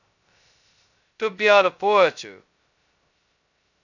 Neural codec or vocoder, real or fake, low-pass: codec, 16 kHz, 0.2 kbps, FocalCodec; fake; 7.2 kHz